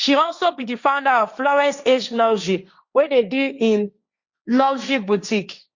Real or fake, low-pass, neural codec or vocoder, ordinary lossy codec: fake; 7.2 kHz; codec, 16 kHz, 1.1 kbps, Voila-Tokenizer; Opus, 64 kbps